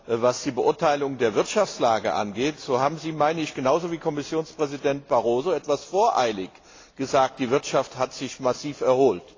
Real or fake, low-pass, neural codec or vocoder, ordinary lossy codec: real; 7.2 kHz; none; AAC, 32 kbps